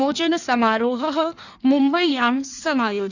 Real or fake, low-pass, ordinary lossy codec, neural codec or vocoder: fake; 7.2 kHz; none; codec, 16 kHz in and 24 kHz out, 1.1 kbps, FireRedTTS-2 codec